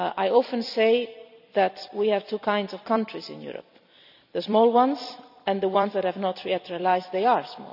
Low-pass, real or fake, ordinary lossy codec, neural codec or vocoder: 5.4 kHz; fake; none; vocoder, 44.1 kHz, 128 mel bands every 256 samples, BigVGAN v2